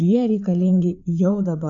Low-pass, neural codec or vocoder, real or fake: 7.2 kHz; codec, 16 kHz, 4 kbps, FreqCodec, larger model; fake